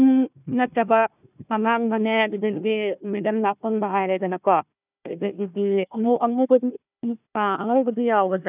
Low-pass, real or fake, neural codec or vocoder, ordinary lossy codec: 3.6 kHz; fake; codec, 16 kHz, 1 kbps, FunCodec, trained on Chinese and English, 50 frames a second; none